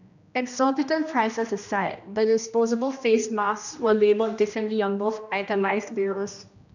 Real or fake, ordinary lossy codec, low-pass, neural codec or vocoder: fake; none; 7.2 kHz; codec, 16 kHz, 1 kbps, X-Codec, HuBERT features, trained on general audio